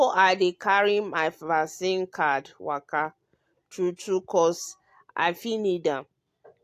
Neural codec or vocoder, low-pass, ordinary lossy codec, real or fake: none; 14.4 kHz; AAC, 64 kbps; real